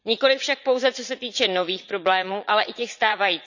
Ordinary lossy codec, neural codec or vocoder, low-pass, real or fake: none; vocoder, 44.1 kHz, 80 mel bands, Vocos; 7.2 kHz; fake